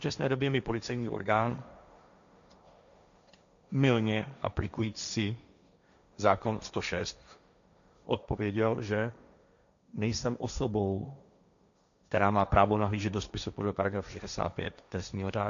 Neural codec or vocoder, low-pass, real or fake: codec, 16 kHz, 1.1 kbps, Voila-Tokenizer; 7.2 kHz; fake